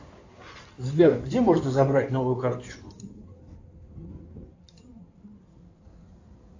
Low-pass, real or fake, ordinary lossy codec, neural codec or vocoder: 7.2 kHz; fake; AAC, 48 kbps; codec, 16 kHz in and 24 kHz out, 2.2 kbps, FireRedTTS-2 codec